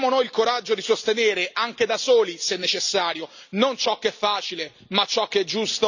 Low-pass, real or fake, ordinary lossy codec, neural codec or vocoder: 7.2 kHz; real; none; none